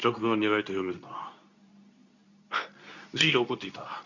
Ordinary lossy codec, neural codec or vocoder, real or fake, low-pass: none; codec, 24 kHz, 0.9 kbps, WavTokenizer, medium speech release version 2; fake; 7.2 kHz